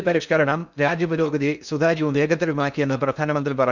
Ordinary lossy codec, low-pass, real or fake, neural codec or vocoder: none; 7.2 kHz; fake; codec, 16 kHz in and 24 kHz out, 0.6 kbps, FocalCodec, streaming, 2048 codes